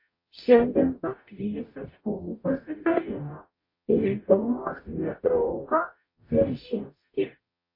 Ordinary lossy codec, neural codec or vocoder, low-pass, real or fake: MP3, 32 kbps; codec, 44.1 kHz, 0.9 kbps, DAC; 5.4 kHz; fake